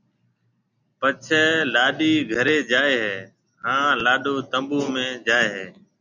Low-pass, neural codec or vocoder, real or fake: 7.2 kHz; none; real